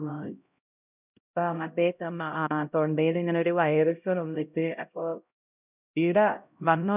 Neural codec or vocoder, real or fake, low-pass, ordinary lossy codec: codec, 16 kHz, 0.5 kbps, X-Codec, HuBERT features, trained on LibriSpeech; fake; 3.6 kHz; none